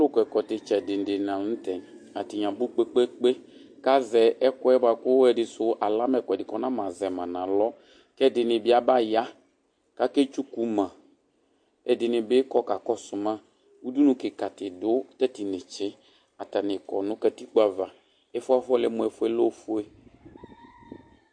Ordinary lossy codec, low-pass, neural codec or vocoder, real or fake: MP3, 48 kbps; 9.9 kHz; none; real